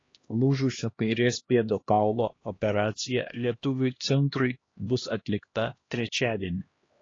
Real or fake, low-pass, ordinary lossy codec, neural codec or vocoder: fake; 7.2 kHz; AAC, 32 kbps; codec, 16 kHz, 1 kbps, X-Codec, HuBERT features, trained on LibriSpeech